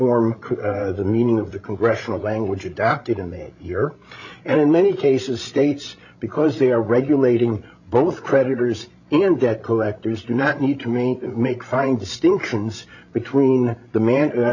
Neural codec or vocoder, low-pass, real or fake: codec, 16 kHz, 16 kbps, FreqCodec, larger model; 7.2 kHz; fake